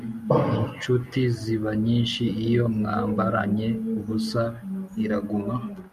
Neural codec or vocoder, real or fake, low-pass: none; real; 14.4 kHz